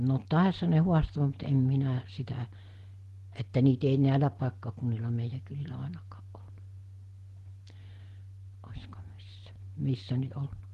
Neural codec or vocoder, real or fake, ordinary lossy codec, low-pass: none; real; Opus, 16 kbps; 14.4 kHz